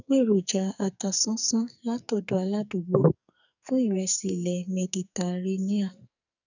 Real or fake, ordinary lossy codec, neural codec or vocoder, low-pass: fake; none; codec, 44.1 kHz, 2.6 kbps, SNAC; 7.2 kHz